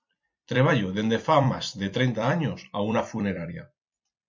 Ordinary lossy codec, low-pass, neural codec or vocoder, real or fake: MP3, 48 kbps; 7.2 kHz; vocoder, 44.1 kHz, 128 mel bands every 256 samples, BigVGAN v2; fake